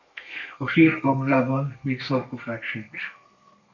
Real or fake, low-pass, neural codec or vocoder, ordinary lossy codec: fake; 7.2 kHz; codec, 32 kHz, 1.9 kbps, SNAC; MP3, 64 kbps